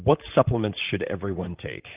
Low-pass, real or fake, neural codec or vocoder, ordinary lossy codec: 3.6 kHz; fake; vocoder, 44.1 kHz, 128 mel bands, Pupu-Vocoder; Opus, 24 kbps